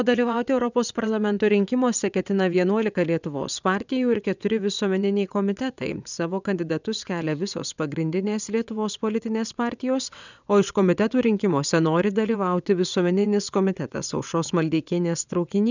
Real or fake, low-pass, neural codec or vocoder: fake; 7.2 kHz; vocoder, 22.05 kHz, 80 mel bands, WaveNeXt